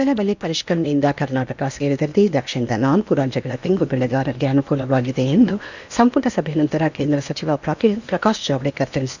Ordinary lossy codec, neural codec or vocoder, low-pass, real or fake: none; codec, 16 kHz in and 24 kHz out, 0.8 kbps, FocalCodec, streaming, 65536 codes; 7.2 kHz; fake